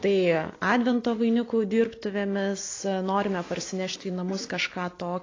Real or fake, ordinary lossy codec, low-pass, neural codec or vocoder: real; AAC, 32 kbps; 7.2 kHz; none